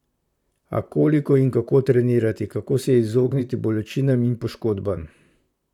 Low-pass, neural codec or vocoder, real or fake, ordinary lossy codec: 19.8 kHz; vocoder, 44.1 kHz, 128 mel bands, Pupu-Vocoder; fake; none